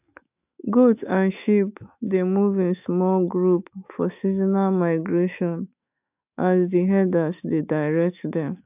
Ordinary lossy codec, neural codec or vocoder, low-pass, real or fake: AAC, 32 kbps; autoencoder, 48 kHz, 128 numbers a frame, DAC-VAE, trained on Japanese speech; 3.6 kHz; fake